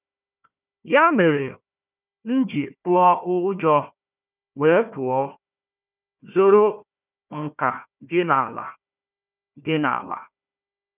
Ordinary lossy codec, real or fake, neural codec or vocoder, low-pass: none; fake; codec, 16 kHz, 1 kbps, FunCodec, trained on Chinese and English, 50 frames a second; 3.6 kHz